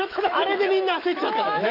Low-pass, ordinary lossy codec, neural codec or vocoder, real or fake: 5.4 kHz; none; autoencoder, 48 kHz, 128 numbers a frame, DAC-VAE, trained on Japanese speech; fake